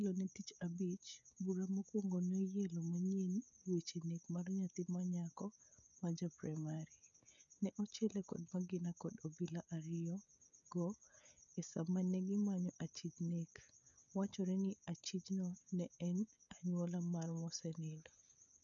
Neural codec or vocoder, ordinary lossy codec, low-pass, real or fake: codec, 16 kHz, 16 kbps, FreqCodec, smaller model; none; 7.2 kHz; fake